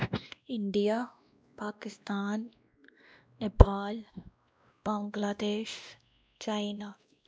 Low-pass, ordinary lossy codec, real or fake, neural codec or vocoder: none; none; fake; codec, 16 kHz, 1 kbps, X-Codec, WavLM features, trained on Multilingual LibriSpeech